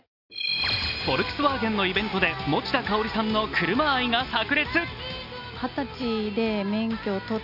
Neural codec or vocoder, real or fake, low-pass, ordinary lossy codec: none; real; 5.4 kHz; none